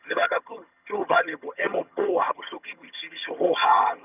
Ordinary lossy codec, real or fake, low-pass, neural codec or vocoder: none; fake; 3.6 kHz; vocoder, 22.05 kHz, 80 mel bands, HiFi-GAN